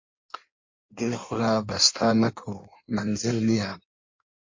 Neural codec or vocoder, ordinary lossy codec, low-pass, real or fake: codec, 16 kHz in and 24 kHz out, 1.1 kbps, FireRedTTS-2 codec; MP3, 48 kbps; 7.2 kHz; fake